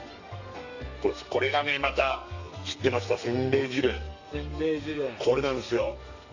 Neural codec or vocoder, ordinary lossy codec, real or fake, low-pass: codec, 32 kHz, 1.9 kbps, SNAC; AAC, 48 kbps; fake; 7.2 kHz